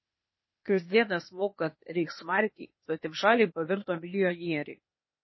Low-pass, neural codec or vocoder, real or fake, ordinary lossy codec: 7.2 kHz; codec, 16 kHz, 0.8 kbps, ZipCodec; fake; MP3, 24 kbps